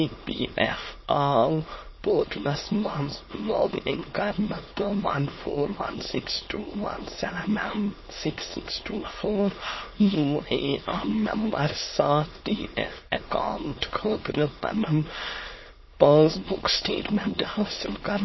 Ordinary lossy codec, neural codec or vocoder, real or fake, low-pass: MP3, 24 kbps; autoencoder, 22.05 kHz, a latent of 192 numbers a frame, VITS, trained on many speakers; fake; 7.2 kHz